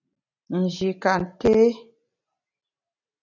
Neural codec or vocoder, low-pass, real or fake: none; 7.2 kHz; real